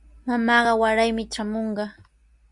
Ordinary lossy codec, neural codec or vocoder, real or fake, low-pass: Opus, 64 kbps; none; real; 10.8 kHz